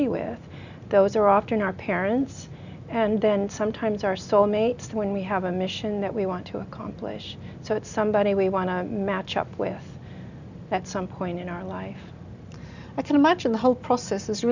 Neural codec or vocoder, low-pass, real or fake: none; 7.2 kHz; real